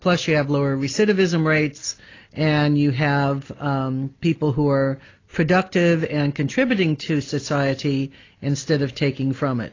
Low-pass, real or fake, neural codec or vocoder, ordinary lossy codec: 7.2 kHz; real; none; AAC, 32 kbps